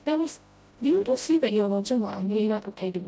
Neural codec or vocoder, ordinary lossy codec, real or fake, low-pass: codec, 16 kHz, 0.5 kbps, FreqCodec, smaller model; none; fake; none